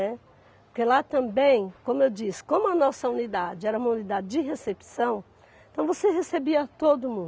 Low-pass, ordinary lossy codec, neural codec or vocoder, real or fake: none; none; none; real